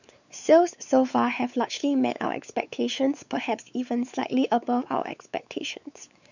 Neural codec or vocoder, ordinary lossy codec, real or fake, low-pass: codec, 16 kHz, 4 kbps, X-Codec, WavLM features, trained on Multilingual LibriSpeech; none; fake; 7.2 kHz